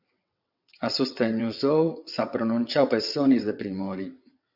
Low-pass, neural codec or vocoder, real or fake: 5.4 kHz; vocoder, 22.05 kHz, 80 mel bands, WaveNeXt; fake